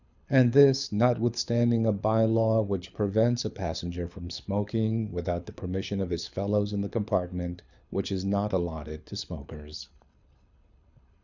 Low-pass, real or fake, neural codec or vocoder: 7.2 kHz; fake; codec, 24 kHz, 6 kbps, HILCodec